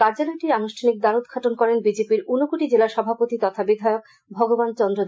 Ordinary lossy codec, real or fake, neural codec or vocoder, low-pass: none; real; none; none